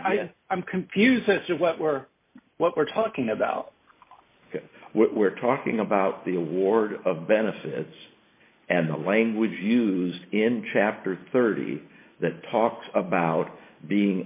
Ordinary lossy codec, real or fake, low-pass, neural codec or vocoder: MP3, 24 kbps; real; 3.6 kHz; none